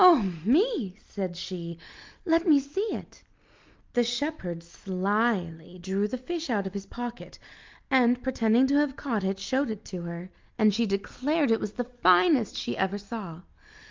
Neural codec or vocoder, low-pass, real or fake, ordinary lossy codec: none; 7.2 kHz; real; Opus, 24 kbps